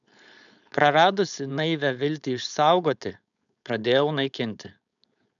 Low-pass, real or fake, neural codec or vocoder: 7.2 kHz; fake; codec, 16 kHz, 4.8 kbps, FACodec